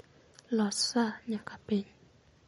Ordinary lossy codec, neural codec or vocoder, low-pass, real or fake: MP3, 48 kbps; none; 19.8 kHz; real